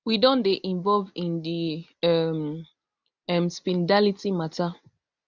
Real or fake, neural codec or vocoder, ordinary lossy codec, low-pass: real; none; none; 7.2 kHz